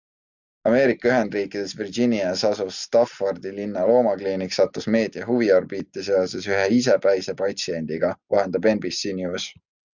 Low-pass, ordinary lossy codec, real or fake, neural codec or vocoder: 7.2 kHz; Opus, 64 kbps; real; none